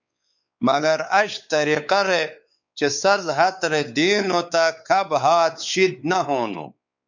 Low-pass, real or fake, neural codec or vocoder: 7.2 kHz; fake; codec, 16 kHz, 4 kbps, X-Codec, WavLM features, trained on Multilingual LibriSpeech